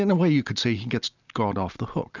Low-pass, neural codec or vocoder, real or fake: 7.2 kHz; none; real